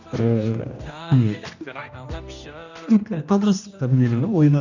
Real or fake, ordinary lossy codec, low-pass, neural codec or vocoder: fake; none; 7.2 kHz; codec, 16 kHz, 1 kbps, X-Codec, HuBERT features, trained on general audio